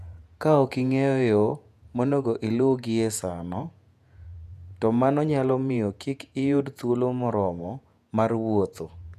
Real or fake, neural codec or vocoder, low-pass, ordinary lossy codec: fake; vocoder, 48 kHz, 128 mel bands, Vocos; 14.4 kHz; none